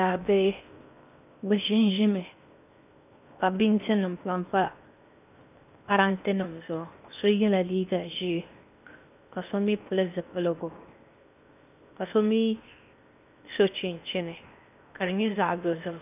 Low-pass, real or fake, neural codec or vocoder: 3.6 kHz; fake; codec, 16 kHz in and 24 kHz out, 0.8 kbps, FocalCodec, streaming, 65536 codes